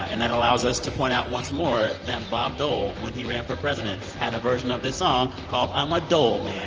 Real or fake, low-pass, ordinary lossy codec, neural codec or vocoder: fake; 7.2 kHz; Opus, 24 kbps; vocoder, 44.1 kHz, 128 mel bands, Pupu-Vocoder